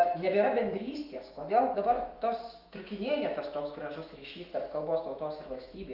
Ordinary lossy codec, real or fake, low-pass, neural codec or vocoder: Opus, 24 kbps; fake; 5.4 kHz; autoencoder, 48 kHz, 128 numbers a frame, DAC-VAE, trained on Japanese speech